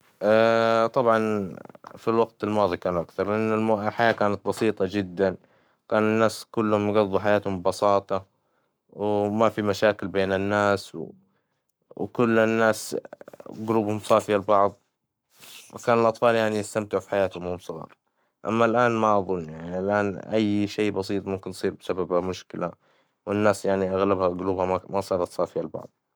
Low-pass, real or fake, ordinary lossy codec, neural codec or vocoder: none; fake; none; codec, 44.1 kHz, 7.8 kbps, Pupu-Codec